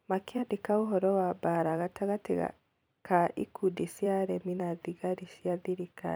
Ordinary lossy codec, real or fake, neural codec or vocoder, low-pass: none; fake; vocoder, 44.1 kHz, 128 mel bands every 256 samples, BigVGAN v2; none